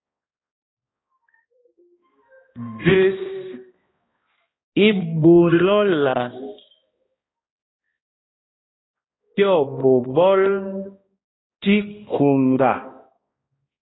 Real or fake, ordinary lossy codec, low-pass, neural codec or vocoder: fake; AAC, 16 kbps; 7.2 kHz; codec, 16 kHz, 1 kbps, X-Codec, HuBERT features, trained on balanced general audio